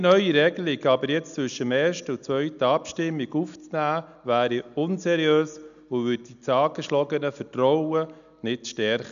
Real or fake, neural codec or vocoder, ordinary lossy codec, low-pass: real; none; none; 7.2 kHz